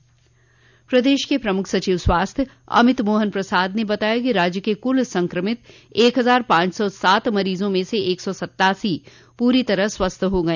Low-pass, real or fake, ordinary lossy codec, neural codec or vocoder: 7.2 kHz; real; none; none